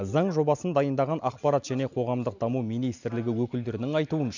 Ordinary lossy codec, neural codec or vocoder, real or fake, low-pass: none; none; real; 7.2 kHz